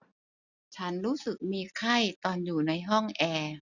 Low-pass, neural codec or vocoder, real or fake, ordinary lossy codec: 7.2 kHz; none; real; none